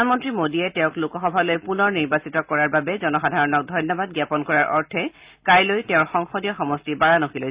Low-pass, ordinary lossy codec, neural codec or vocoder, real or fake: 3.6 kHz; Opus, 64 kbps; none; real